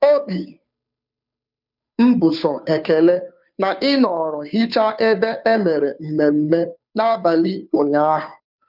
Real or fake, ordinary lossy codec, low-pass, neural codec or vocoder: fake; none; 5.4 kHz; codec, 16 kHz, 2 kbps, FunCodec, trained on Chinese and English, 25 frames a second